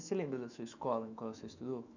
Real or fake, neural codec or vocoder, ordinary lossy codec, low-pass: fake; autoencoder, 48 kHz, 128 numbers a frame, DAC-VAE, trained on Japanese speech; none; 7.2 kHz